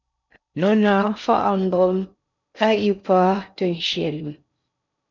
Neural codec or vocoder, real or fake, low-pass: codec, 16 kHz in and 24 kHz out, 0.6 kbps, FocalCodec, streaming, 4096 codes; fake; 7.2 kHz